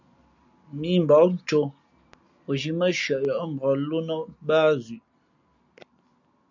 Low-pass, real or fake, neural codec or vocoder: 7.2 kHz; real; none